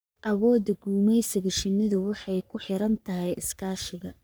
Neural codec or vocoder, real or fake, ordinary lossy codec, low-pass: codec, 44.1 kHz, 3.4 kbps, Pupu-Codec; fake; none; none